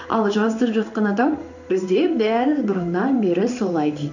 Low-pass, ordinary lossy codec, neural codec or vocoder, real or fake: 7.2 kHz; none; codec, 16 kHz in and 24 kHz out, 1 kbps, XY-Tokenizer; fake